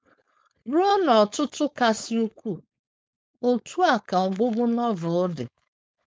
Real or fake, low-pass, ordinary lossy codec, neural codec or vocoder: fake; none; none; codec, 16 kHz, 4.8 kbps, FACodec